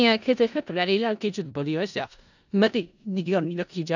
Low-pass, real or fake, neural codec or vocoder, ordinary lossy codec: 7.2 kHz; fake; codec, 16 kHz in and 24 kHz out, 0.4 kbps, LongCat-Audio-Codec, four codebook decoder; none